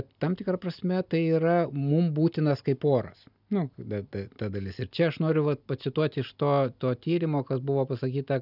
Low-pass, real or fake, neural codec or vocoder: 5.4 kHz; real; none